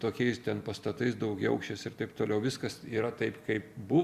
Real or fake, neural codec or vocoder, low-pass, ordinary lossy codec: real; none; 14.4 kHz; Opus, 64 kbps